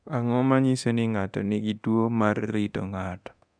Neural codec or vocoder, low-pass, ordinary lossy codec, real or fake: codec, 24 kHz, 0.9 kbps, DualCodec; 9.9 kHz; none; fake